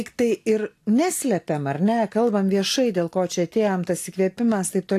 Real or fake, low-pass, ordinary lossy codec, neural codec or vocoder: real; 14.4 kHz; AAC, 64 kbps; none